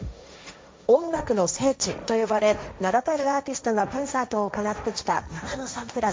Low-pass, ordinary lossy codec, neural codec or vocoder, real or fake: none; none; codec, 16 kHz, 1.1 kbps, Voila-Tokenizer; fake